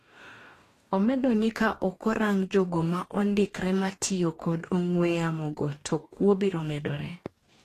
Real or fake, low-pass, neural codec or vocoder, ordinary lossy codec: fake; 14.4 kHz; codec, 44.1 kHz, 2.6 kbps, DAC; AAC, 48 kbps